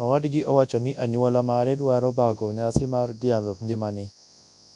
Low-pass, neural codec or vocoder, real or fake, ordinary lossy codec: 10.8 kHz; codec, 24 kHz, 0.9 kbps, WavTokenizer, large speech release; fake; none